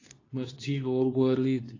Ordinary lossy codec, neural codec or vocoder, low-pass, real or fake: AAC, 32 kbps; codec, 24 kHz, 0.9 kbps, WavTokenizer, medium speech release version 2; 7.2 kHz; fake